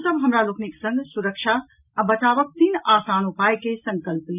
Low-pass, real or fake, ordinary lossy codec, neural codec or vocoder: 3.6 kHz; real; none; none